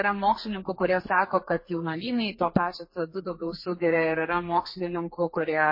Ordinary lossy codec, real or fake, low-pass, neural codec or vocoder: MP3, 24 kbps; fake; 5.4 kHz; codec, 32 kHz, 1.9 kbps, SNAC